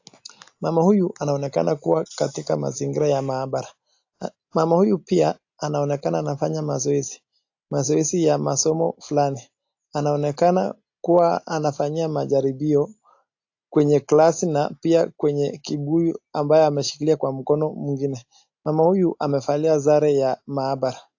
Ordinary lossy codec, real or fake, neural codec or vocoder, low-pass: AAC, 48 kbps; real; none; 7.2 kHz